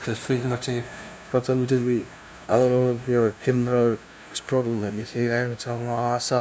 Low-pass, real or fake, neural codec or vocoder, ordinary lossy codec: none; fake; codec, 16 kHz, 0.5 kbps, FunCodec, trained on LibriTTS, 25 frames a second; none